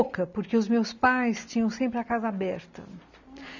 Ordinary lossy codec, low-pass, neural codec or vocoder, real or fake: none; 7.2 kHz; none; real